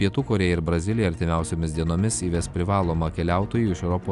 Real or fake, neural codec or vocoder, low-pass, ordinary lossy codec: real; none; 10.8 kHz; Opus, 64 kbps